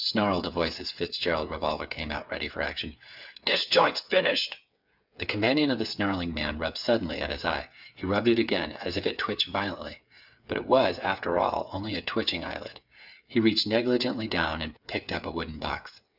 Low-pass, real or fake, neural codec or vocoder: 5.4 kHz; fake; codec, 16 kHz, 8 kbps, FreqCodec, smaller model